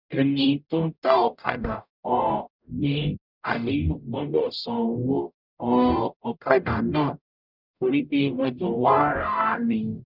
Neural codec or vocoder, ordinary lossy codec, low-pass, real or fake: codec, 44.1 kHz, 0.9 kbps, DAC; none; 5.4 kHz; fake